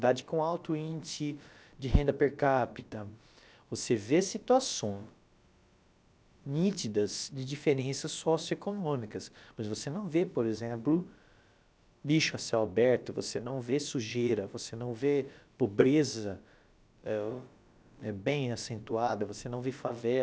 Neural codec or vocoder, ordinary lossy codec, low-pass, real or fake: codec, 16 kHz, about 1 kbps, DyCAST, with the encoder's durations; none; none; fake